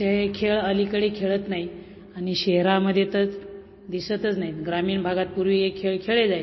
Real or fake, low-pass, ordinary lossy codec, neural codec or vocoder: real; 7.2 kHz; MP3, 24 kbps; none